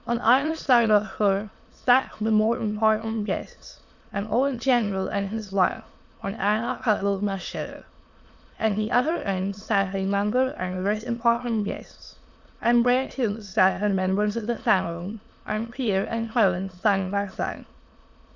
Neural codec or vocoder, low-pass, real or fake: autoencoder, 22.05 kHz, a latent of 192 numbers a frame, VITS, trained on many speakers; 7.2 kHz; fake